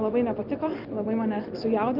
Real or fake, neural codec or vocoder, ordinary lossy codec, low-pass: real; none; Opus, 32 kbps; 5.4 kHz